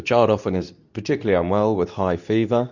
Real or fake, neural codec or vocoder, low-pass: fake; codec, 24 kHz, 0.9 kbps, WavTokenizer, medium speech release version 2; 7.2 kHz